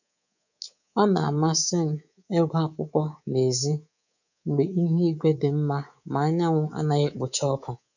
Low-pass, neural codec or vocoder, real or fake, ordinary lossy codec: 7.2 kHz; codec, 24 kHz, 3.1 kbps, DualCodec; fake; none